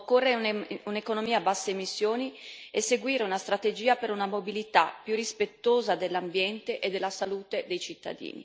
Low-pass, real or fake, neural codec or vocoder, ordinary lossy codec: none; real; none; none